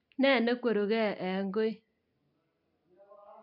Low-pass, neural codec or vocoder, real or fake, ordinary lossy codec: 5.4 kHz; none; real; none